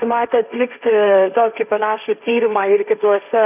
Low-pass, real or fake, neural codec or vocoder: 3.6 kHz; fake; codec, 16 kHz, 1.1 kbps, Voila-Tokenizer